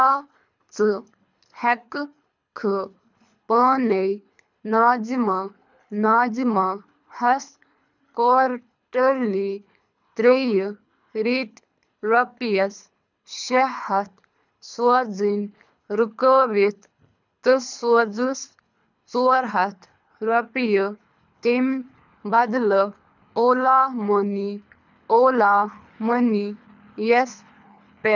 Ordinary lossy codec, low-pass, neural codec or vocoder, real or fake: none; 7.2 kHz; codec, 24 kHz, 3 kbps, HILCodec; fake